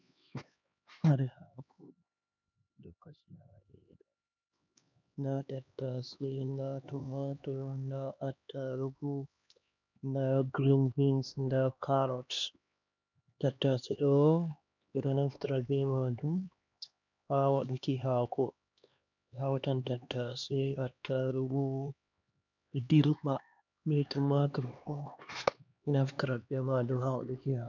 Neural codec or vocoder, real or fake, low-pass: codec, 16 kHz, 2 kbps, X-Codec, HuBERT features, trained on LibriSpeech; fake; 7.2 kHz